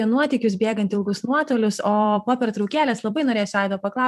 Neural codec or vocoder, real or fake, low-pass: vocoder, 44.1 kHz, 128 mel bands every 256 samples, BigVGAN v2; fake; 14.4 kHz